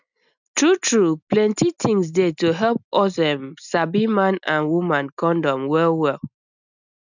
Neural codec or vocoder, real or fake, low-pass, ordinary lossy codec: none; real; 7.2 kHz; none